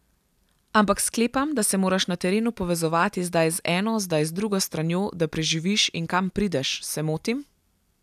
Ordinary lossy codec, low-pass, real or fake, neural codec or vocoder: none; 14.4 kHz; real; none